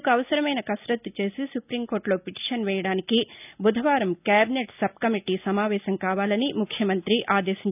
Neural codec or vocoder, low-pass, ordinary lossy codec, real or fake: none; 3.6 kHz; none; real